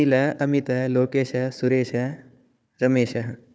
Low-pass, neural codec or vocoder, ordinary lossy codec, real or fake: none; codec, 16 kHz, 4 kbps, FunCodec, trained on Chinese and English, 50 frames a second; none; fake